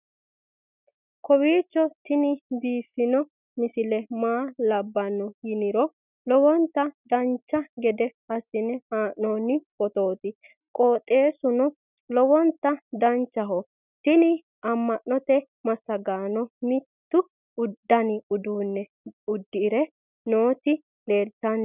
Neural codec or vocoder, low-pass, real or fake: none; 3.6 kHz; real